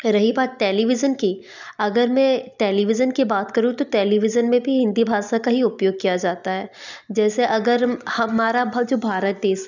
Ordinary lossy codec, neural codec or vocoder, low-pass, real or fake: none; none; 7.2 kHz; real